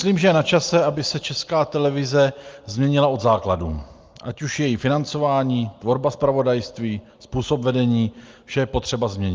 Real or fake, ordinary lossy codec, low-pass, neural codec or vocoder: real; Opus, 32 kbps; 7.2 kHz; none